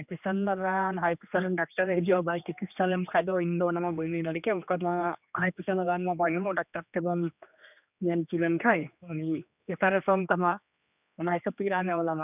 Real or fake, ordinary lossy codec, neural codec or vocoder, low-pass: fake; none; codec, 16 kHz, 2 kbps, X-Codec, HuBERT features, trained on general audio; 3.6 kHz